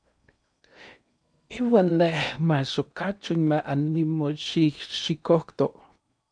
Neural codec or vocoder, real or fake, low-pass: codec, 16 kHz in and 24 kHz out, 0.8 kbps, FocalCodec, streaming, 65536 codes; fake; 9.9 kHz